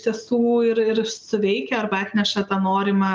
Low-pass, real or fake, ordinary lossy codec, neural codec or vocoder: 7.2 kHz; real; Opus, 32 kbps; none